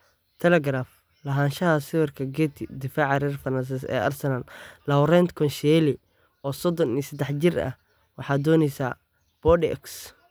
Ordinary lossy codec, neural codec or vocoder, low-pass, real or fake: none; none; none; real